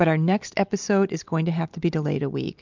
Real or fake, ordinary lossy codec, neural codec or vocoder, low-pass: real; MP3, 64 kbps; none; 7.2 kHz